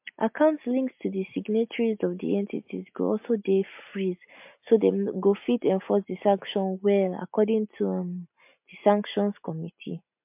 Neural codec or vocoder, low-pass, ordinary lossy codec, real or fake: none; 3.6 kHz; MP3, 32 kbps; real